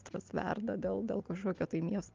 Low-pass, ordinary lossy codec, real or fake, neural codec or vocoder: 7.2 kHz; Opus, 32 kbps; real; none